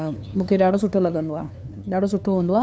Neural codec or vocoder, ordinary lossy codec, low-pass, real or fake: codec, 16 kHz, 2 kbps, FunCodec, trained on LibriTTS, 25 frames a second; none; none; fake